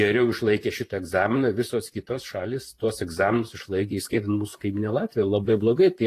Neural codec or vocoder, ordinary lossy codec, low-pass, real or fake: vocoder, 44.1 kHz, 128 mel bands, Pupu-Vocoder; AAC, 48 kbps; 14.4 kHz; fake